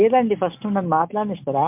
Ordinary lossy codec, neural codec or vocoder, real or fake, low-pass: MP3, 32 kbps; none; real; 3.6 kHz